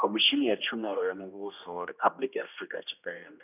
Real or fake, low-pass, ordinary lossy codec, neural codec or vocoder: fake; 3.6 kHz; none; codec, 16 kHz, 1 kbps, X-Codec, HuBERT features, trained on general audio